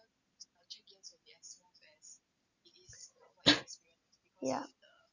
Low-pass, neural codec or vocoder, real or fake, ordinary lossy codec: 7.2 kHz; none; real; none